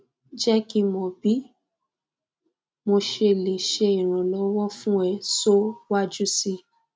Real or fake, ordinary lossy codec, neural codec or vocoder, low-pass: real; none; none; none